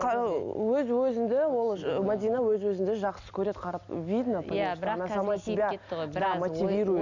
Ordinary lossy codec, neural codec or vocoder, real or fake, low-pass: none; none; real; 7.2 kHz